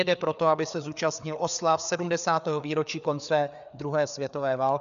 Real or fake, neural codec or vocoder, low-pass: fake; codec, 16 kHz, 4 kbps, FreqCodec, larger model; 7.2 kHz